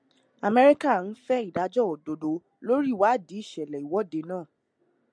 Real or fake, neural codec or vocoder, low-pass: real; none; 9.9 kHz